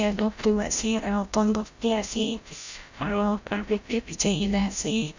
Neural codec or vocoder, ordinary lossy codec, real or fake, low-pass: codec, 16 kHz, 0.5 kbps, FreqCodec, larger model; Opus, 64 kbps; fake; 7.2 kHz